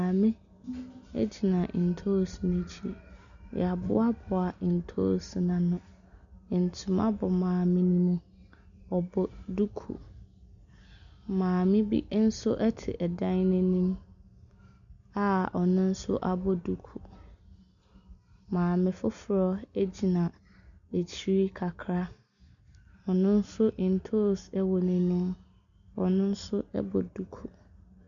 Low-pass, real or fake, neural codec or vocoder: 7.2 kHz; real; none